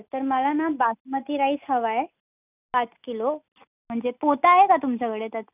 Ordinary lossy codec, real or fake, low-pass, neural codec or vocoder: none; real; 3.6 kHz; none